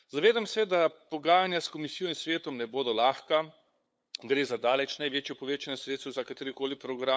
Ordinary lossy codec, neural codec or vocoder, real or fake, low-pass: none; codec, 16 kHz, 8 kbps, FunCodec, trained on LibriTTS, 25 frames a second; fake; none